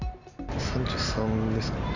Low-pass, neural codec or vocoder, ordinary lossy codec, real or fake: 7.2 kHz; none; none; real